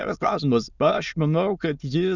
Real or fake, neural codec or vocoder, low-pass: fake; autoencoder, 22.05 kHz, a latent of 192 numbers a frame, VITS, trained on many speakers; 7.2 kHz